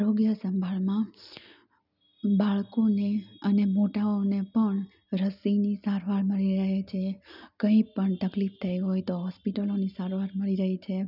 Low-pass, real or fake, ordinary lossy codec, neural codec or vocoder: 5.4 kHz; real; none; none